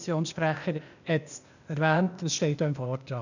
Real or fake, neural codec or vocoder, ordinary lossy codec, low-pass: fake; codec, 16 kHz, 0.8 kbps, ZipCodec; none; 7.2 kHz